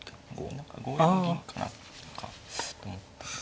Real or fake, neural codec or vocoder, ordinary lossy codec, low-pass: real; none; none; none